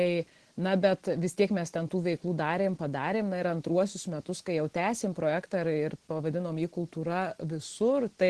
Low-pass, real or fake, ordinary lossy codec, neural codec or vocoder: 10.8 kHz; real; Opus, 16 kbps; none